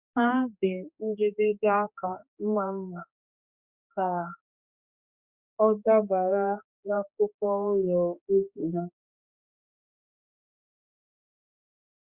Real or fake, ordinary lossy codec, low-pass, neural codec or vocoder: fake; Opus, 64 kbps; 3.6 kHz; codec, 16 kHz, 2 kbps, X-Codec, HuBERT features, trained on general audio